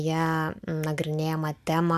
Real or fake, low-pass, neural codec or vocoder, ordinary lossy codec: real; 14.4 kHz; none; AAC, 96 kbps